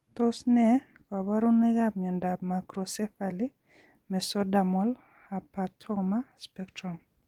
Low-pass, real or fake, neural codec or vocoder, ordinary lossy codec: 19.8 kHz; real; none; Opus, 24 kbps